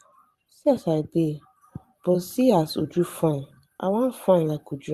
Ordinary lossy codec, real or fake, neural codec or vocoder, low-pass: Opus, 64 kbps; fake; vocoder, 44.1 kHz, 128 mel bands every 512 samples, BigVGAN v2; 14.4 kHz